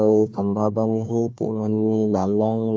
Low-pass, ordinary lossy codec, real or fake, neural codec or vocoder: none; none; fake; codec, 16 kHz, 1 kbps, FunCodec, trained on Chinese and English, 50 frames a second